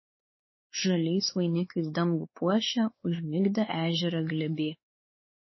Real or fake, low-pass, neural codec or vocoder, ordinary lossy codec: fake; 7.2 kHz; codec, 16 kHz, 4 kbps, X-Codec, WavLM features, trained on Multilingual LibriSpeech; MP3, 24 kbps